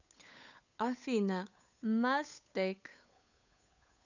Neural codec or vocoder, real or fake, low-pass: codec, 16 kHz, 8 kbps, FunCodec, trained on Chinese and English, 25 frames a second; fake; 7.2 kHz